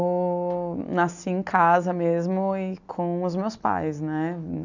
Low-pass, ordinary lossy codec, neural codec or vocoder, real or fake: 7.2 kHz; none; none; real